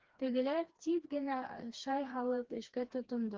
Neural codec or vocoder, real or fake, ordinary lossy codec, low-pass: codec, 16 kHz, 2 kbps, FreqCodec, smaller model; fake; Opus, 16 kbps; 7.2 kHz